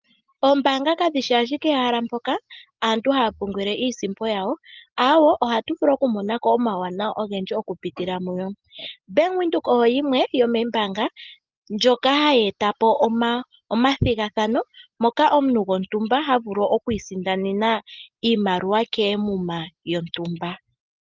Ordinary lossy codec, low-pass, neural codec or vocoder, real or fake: Opus, 32 kbps; 7.2 kHz; none; real